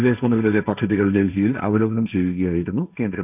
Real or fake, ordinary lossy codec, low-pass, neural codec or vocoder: fake; none; 3.6 kHz; codec, 16 kHz, 1.1 kbps, Voila-Tokenizer